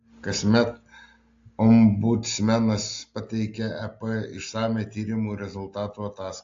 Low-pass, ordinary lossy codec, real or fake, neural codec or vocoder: 7.2 kHz; AAC, 48 kbps; real; none